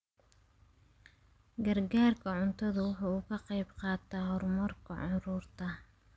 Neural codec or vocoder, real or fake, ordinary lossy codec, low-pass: none; real; none; none